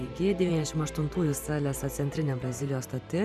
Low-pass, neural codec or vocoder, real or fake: 14.4 kHz; vocoder, 44.1 kHz, 128 mel bands every 512 samples, BigVGAN v2; fake